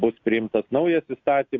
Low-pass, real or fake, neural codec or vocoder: 7.2 kHz; real; none